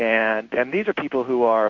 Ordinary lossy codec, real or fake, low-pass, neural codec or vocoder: MP3, 64 kbps; fake; 7.2 kHz; codec, 16 kHz in and 24 kHz out, 1 kbps, XY-Tokenizer